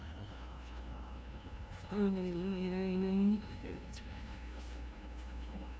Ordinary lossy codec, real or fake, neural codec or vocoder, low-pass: none; fake; codec, 16 kHz, 0.5 kbps, FunCodec, trained on LibriTTS, 25 frames a second; none